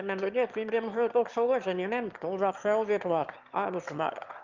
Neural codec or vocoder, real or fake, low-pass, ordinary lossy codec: autoencoder, 22.05 kHz, a latent of 192 numbers a frame, VITS, trained on one speaker; fake; 7.2 kHz; Opus, 24 kbps